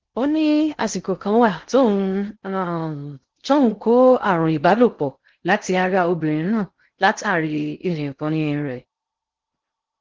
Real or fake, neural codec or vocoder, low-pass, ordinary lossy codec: fake; codec, 16 kHz in and 24 kHz out, 0.6 kbps, FocalCodec, streaming, 2048 codes; 7.2 kHz; Opus, 16 kbps